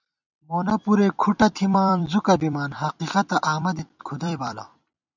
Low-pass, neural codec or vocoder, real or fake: 7.2 kHz; vocoder, 44.1 kHz, 128 mel bands every 256 samples, BigVGAN v2; fake